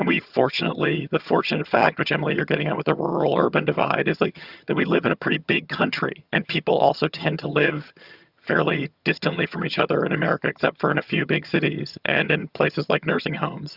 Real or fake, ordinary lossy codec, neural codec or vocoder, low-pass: fake; Opus, 64 kbps; vocoder, 22.05 kHz, 80 mel bands, HiFi-GAN; 5.4 kHz